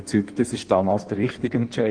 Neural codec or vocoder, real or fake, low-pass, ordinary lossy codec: codec, 16 kHz in and 24 kHz out, 1.1 kbps, FireRedTTS-2 codec; fake; 9.9 kHz; Opus, 24 kbps